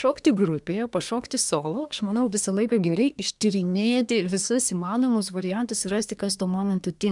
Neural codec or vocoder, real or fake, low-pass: codec, 24 kHz, 1 kbps, SNAC; fake; 10.8 kHz